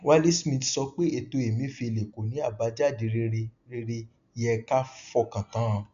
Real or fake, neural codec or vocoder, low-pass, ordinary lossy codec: real; none; 7.2 kHz; AAC, 96 kbps